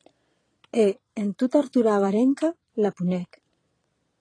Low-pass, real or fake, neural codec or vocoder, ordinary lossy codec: 9.9 kHz; fake; vocoder, 22.05 kHz, 80 mel bands, Vocos; AAC, 32 kbps